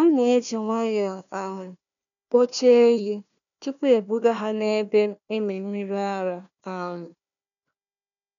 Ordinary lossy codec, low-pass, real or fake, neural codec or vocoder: none; 7.2 kHz; fake; codec, 16 kHz, 1 kbps, FunCodec, trained on Chinese and English, 50 frames a second